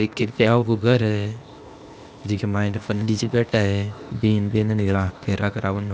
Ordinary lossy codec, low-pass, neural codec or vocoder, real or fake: none; none; codec, 16 kHz, 0.8 kbps, ZipCodec; fake